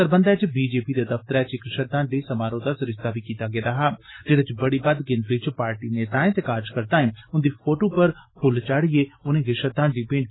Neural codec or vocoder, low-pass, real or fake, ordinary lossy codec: none; 7.2 kHz; real; AAC, 16 kbps